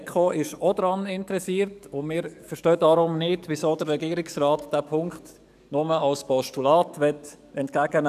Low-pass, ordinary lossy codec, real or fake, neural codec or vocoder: 14.4 kHz; none; fake; codec, 44.1 kHz, 7.8 kbps, DAC